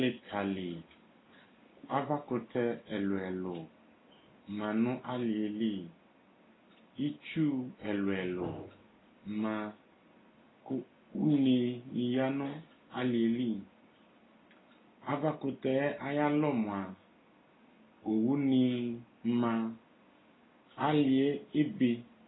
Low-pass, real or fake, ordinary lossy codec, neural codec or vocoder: 7.2 kHz; real; AAC, 16 kbps; none